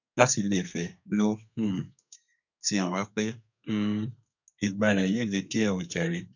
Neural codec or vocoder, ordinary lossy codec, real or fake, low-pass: codec, 32 kHz, 1.9 kbps, SNAC; none; fake; 7.2 kHz